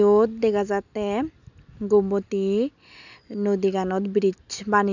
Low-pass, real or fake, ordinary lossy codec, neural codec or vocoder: 7.2 kHz; real; none; none